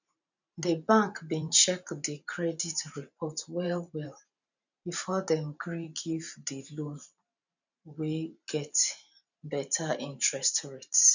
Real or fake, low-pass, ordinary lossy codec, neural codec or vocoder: fake; 7.2 kHz; none; vocoder, 44.1 kHz, 128 mel bands every 512 samples, BigVGAN v2